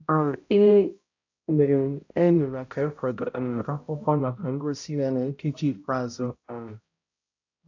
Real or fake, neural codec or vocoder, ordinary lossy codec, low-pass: fake; codec, 16 kHz, 0.5 kbps, X-Codec, HuBERT features, trained on balanced general audio; AAC, 48 kbps; 7.2 kHz